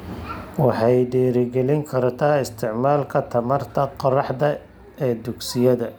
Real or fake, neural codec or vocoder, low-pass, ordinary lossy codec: real; none; none; none